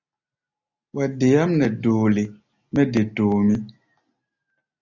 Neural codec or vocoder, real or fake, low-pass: none; real; 7.2 kHz